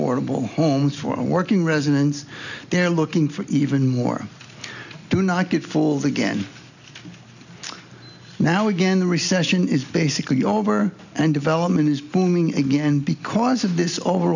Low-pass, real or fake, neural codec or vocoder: 7.2 kHz; real; none